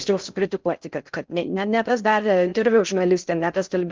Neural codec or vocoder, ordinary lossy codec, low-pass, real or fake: codec, 16 kHz in and 24 kHz out, 0.6 kbps, FocalCodec, streaming, 4096 codes; Opus, 24 kbps; 7.2 kHz; fake